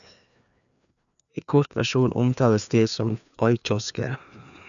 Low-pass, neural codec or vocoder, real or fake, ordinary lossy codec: 7.2 kHz; codec, 16 kHz, 2 kbps, FreqCodec, larger model; fake; none